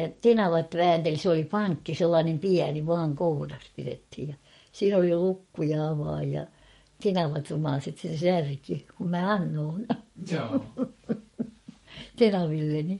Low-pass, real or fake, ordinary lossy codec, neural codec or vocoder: 19.8 kHz; fake; MP3, 48 kbps; codec, 44.1 kHz, 7.8 kbps, DAC